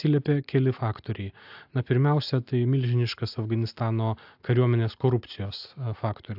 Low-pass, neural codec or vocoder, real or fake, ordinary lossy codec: 5.4 kHz; none; real; AAC, 48 kbps